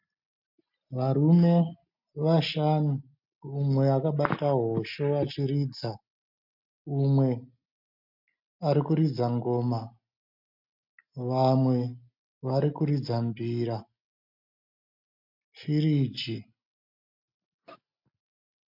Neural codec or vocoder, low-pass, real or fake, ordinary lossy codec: none; 5.4 kHz; real; MP3, 48 kbps